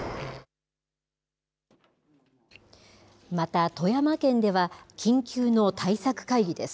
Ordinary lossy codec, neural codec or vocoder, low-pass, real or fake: none; none; none; real